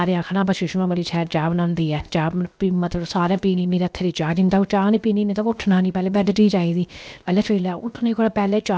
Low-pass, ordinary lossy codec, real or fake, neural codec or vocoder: none; none; fake; codec, 16 kHz, 0.7 kbps, FocalCodec